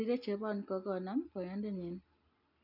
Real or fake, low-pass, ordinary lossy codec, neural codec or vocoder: real; 5.4 kHz; none; none